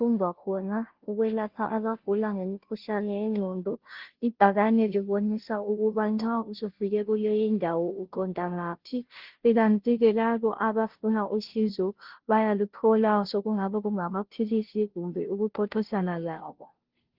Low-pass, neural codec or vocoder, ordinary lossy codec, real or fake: 5.4 kHz; codec, 16 kHz, 0.5 kbps, FunCodec, trained on Chinese and English, 25 frames a second; Opus, 16 kbps; fake